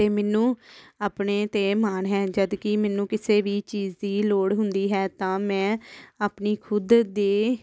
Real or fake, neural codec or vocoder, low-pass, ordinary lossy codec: real; none; none; none